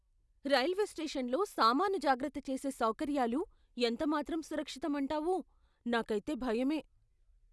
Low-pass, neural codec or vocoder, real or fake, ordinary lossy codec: none; none; real; none